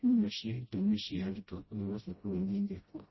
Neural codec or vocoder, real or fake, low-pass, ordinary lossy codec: codec, 16 kHz, 0.5 kbps, FreqCodec, smaller model; fake; 7.2 kHz; MP3, 24 kbps